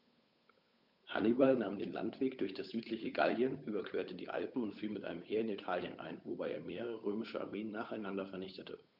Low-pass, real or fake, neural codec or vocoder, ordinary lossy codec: 5.4 kHz; fake; codec, 16 kHz, 8 kbps, FunCodec, trained on Chinese and English, 25 frames a second; none